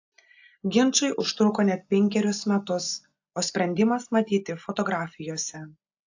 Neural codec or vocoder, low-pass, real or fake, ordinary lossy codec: none; 7.2 kHz; real; AAC, 48 kbps